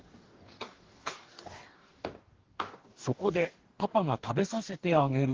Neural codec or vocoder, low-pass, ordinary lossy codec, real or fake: codec, 44.1 kHz, 2.6 kbps, DAC; 7.2 kHz; Opus, 16 kbps; fake